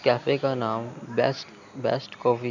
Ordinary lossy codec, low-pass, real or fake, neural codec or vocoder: none; 7.2 kHz; real; none